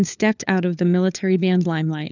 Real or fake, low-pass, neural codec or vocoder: fake; 7.2 kHz; codec, 16 kHz, 4 kbps, FunCodec, trained on LibriTTS, 50 frames a second